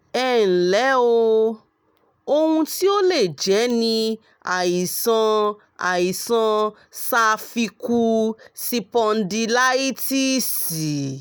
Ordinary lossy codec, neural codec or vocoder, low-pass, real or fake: none; none; none; real